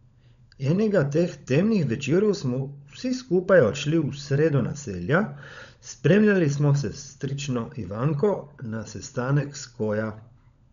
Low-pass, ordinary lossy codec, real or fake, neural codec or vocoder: 7.2 kHz; none; fake; codec, 16 kHz, 16 kbps, FunCodec, trained on LibriTTS, 50 frames a second